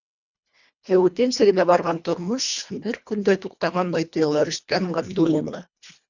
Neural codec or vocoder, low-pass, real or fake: codec, 24 kHz, 1.5 kbps, HILCodec; 7.2 kHz; fake